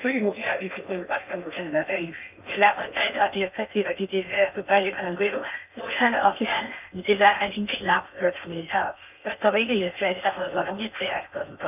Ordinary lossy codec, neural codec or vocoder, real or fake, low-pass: none; codec, 16 kHz in and 24 kHz out, 0.6 kbps, FocalCodec, streaming, 2048 codes; fake; 3.6 kHz